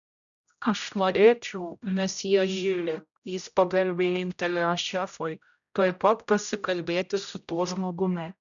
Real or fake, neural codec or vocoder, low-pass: fake; codec, 16 kHz, 0.5 kbps, X-Codec, HuBERT features, trained on general audio; 7.2 kHz